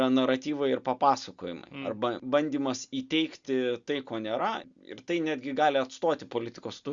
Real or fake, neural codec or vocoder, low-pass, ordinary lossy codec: real; none; 7.2 kHz; Opus, 64 kbps